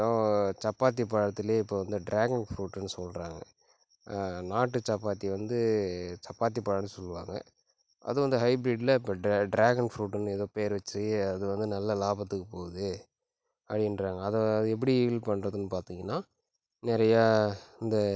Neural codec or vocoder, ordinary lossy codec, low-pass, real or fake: none; none; none; real